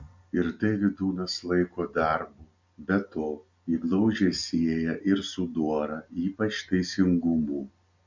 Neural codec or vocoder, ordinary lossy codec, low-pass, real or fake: none; MP3, 64 kbps; 7.2 kHz; real